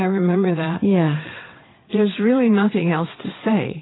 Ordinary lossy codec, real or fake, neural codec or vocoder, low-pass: AAC, 16 kbps; fake; vocoder, 22.05 kHz, 80 mel bands, WaveNeXt; 7.2 kHz